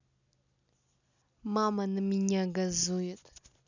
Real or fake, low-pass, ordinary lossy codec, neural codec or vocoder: real; 7.2 kHz; none; none